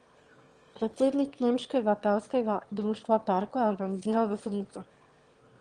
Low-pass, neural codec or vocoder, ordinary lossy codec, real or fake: 9.9 kHz; autoencoder, 22.05 kHz, a latent of 192 numbers a frame, VITS, trained on one speaker; Opus, 24 kbps; fake